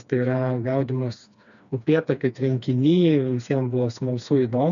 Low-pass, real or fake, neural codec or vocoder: 7.2 kHz; fake; codec, 16 kHz, 2 kbps, FreqCodec, smaller model